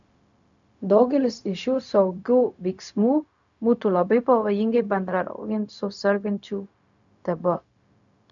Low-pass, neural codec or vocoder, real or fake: 7.2 kHz; codec, 16 kHz, 0.4 kbps, LongCat-Audio-Codec; fake